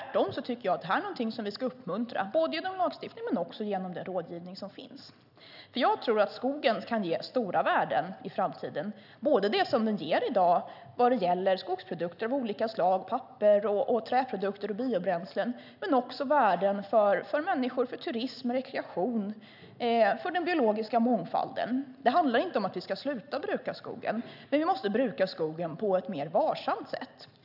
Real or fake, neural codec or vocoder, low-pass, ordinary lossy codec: real; none; 5.4 kHz; none